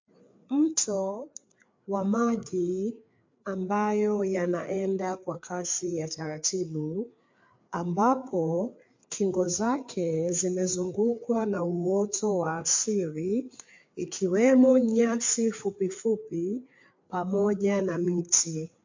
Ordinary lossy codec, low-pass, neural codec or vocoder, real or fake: MP3, 48 kbps; 7.2 kHz; codec, 16 kHz, 4 kbps, FreqCodec, larger model; fake